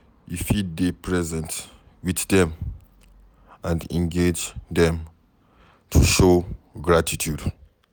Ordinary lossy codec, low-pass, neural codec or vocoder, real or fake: none; none; none; real